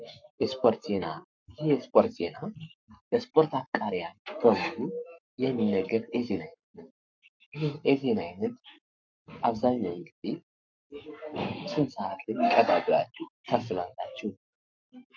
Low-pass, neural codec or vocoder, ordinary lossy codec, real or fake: 7.2 kHz; codec, 44.1 kHz, 7.8 kbps, Pupu-Codec; MP3, 64 kbps; fake